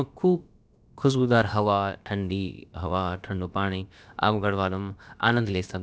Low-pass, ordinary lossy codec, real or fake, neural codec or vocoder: none; none; fake; codec, 16 kHz, about 1 kbps, DyCAST, with the encoder's durations